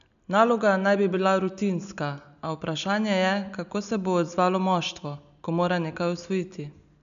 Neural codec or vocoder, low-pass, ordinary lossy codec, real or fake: none; 7.2 kHz; none; real